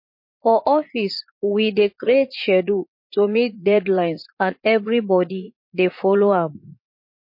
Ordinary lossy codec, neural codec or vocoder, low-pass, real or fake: MP3, 32 kbps; vocoder, 44.1 kHz, 80 mel bands, Vocos; 5.4 kHz; fake